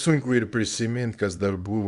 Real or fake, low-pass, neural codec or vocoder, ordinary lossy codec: fake; 10.8 kHz; codec, 24 kHz, 0.9 kbps, WavTokenizer, small release; Opus, 64 kbps